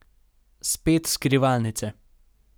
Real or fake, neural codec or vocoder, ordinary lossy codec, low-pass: real; none; none; none